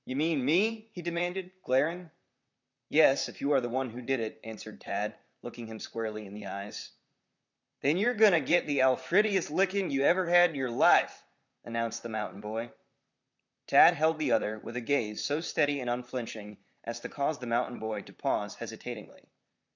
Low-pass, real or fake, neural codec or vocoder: 7.2 kHz; fake; vocoder, 44.1 kHz, 128 mel bands, Pupu-Vocoder